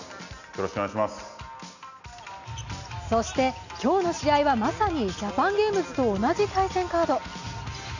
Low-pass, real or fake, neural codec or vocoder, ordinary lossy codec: 7.2 kHz; real; none; none